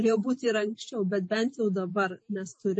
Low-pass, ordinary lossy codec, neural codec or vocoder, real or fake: 10.8 kHz; MP3, 32 kbps; vocoder, 48 kHz, 128 mel bands, Vocos; fake